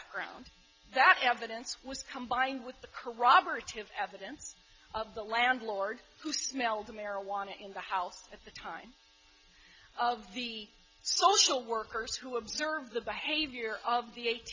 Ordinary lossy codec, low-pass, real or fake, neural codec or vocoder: MP3, 48 kbps; 7.2 kHz; real; none